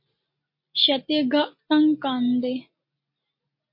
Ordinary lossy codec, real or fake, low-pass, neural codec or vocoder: MP3, 32 kbps; real; 5.4 kHz; none